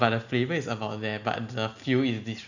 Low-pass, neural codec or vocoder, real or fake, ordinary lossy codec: 7.2 kHz; none; real; none